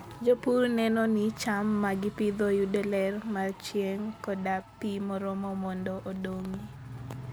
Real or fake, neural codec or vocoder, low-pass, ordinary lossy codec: real; none; none; none